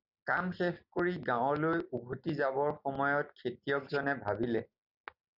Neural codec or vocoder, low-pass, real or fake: none; 5.4 kHz; real